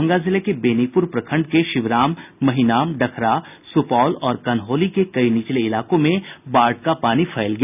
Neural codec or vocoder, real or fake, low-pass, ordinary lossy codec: none; real; 3.6 kHz; none